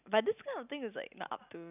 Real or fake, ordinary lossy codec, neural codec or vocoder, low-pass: real; none; none; 3.6 kHz